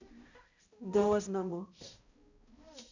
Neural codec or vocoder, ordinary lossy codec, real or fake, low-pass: codec, 16 kHz, 0.5 kbps, X-Codec, HuBERT features, trained on balanced general audio; Opus, 64 kbps; fake; 7.2 kHz